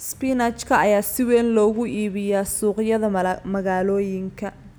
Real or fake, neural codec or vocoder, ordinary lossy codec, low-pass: real; none; none; none